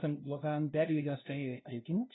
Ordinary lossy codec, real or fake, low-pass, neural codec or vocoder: AAC, 16 kbps; fake; 7.2 kHz; codec, 16 kHz, 0.5 kbps, FunCodec, trained on LibriTTS, 25 frames a second